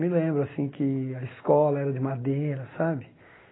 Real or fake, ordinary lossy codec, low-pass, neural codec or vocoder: real; AAC, 16 kbps; 7.2 kHz; none